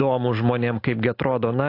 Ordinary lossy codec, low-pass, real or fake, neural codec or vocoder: AAC, 32 kbps; 5.4 kHz; real; none